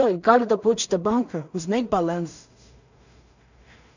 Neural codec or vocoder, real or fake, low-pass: codec, 16 kHz in and 24 kHz out, 0.4 kbps, LongCat-Audio-Codec, two codebook decoder; fake; 7.2 kHz